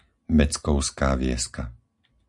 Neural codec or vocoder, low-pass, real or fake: none; 10.8 kHz; real